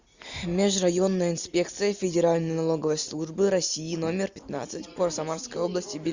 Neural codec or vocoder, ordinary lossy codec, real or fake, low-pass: none; Opus, 64 kbps; real; 7.2 kHz